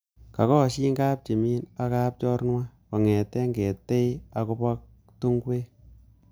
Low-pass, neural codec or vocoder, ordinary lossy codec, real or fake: none; none; none; real